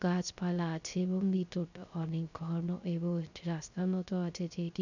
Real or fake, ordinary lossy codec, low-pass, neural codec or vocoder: fake; none; 7.2 kHz; codec, 16 kHz, 0.3 kbps, FocalCodec